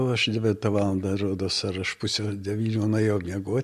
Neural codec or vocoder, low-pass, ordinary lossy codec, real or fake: none; 14.4 kHz; MP3, 64 kbps; real